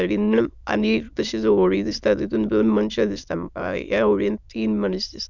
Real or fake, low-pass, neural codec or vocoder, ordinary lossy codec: fake; 7.2 kHz; autoencoder, 22.05 kHz, a latent of 192 numbers a frame, VITS, trained on many speakers; none